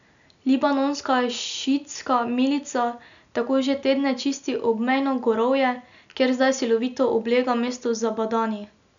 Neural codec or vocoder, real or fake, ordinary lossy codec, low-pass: none; real; none; 7.2 kHz